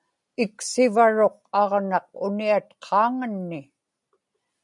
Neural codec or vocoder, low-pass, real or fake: none; 10.8 kHz; real